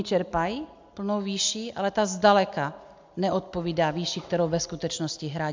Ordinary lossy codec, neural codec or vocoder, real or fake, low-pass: MP3, 64 kbps; none; real; 7.2 kHz